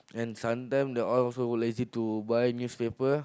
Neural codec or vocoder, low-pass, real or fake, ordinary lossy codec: none; none; real; none